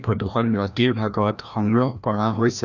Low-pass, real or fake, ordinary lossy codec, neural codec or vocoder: 7.2 kHz; fake; none; codec, 16 kHz, 1 kbps, FreqCodec, larger model